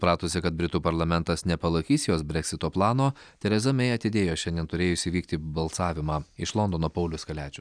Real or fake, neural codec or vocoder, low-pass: real; none; 9.9 kHz